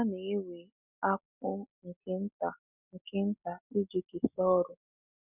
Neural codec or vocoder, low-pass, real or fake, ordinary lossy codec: none; 3.6 kHz; real; none